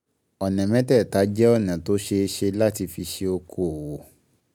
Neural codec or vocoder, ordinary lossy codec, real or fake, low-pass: none; none; real; none